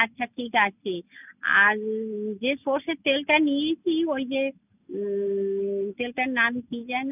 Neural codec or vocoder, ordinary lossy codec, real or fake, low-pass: none; none; real; 3.6 kHz